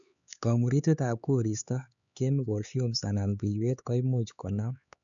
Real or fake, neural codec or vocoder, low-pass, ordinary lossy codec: fake; codec, 16 kHz, 4 kbps, X-Codec, HuBERT features, trained on LibriSpeech; 7.2 kHz; none